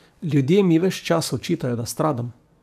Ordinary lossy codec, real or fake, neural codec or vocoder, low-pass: AAC, 96 kbps; fake; codec, 44.1 kHz, 7.8 kbps, DAC; 14.4 kHz